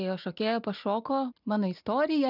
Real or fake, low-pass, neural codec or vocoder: real; 5.4 kHz; none